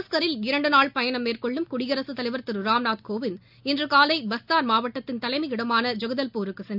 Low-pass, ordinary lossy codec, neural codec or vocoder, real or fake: 5.4 kHz; none; none; real